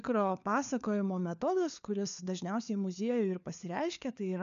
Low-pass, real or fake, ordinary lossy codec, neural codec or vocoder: 7.2 kHz; fake; MP3, 96 kbps; codec, 16 kHz, 4 kbps, FunCodec, trained on LibriTTS, 50 frames a second